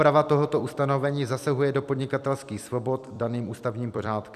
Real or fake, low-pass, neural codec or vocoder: fake; 14.4 kHz; vocoder, 44.1 kHz, 128 mel bands every 256 samples, BigVGAN v2